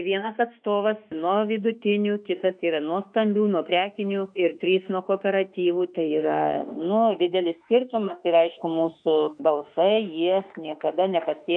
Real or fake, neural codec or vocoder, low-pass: fake; autoencoder, 48 kHz, 32 numbers a frame, DAC-VAE, trained on Japanese speech; 9.9 kHz